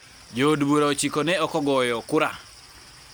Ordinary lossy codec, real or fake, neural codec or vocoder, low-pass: none; real; none; none